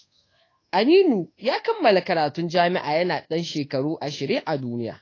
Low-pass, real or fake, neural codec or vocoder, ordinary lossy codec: 7.2 kHz; fake; codec, 24 kHz, 1.2 kbps, DualCodec; AAC, 32 kbps